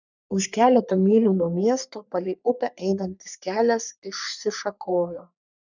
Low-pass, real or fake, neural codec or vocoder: 7.2 kHz; fake; codec, 44.1 kHz, 3.4 kbps, Pupu-Codec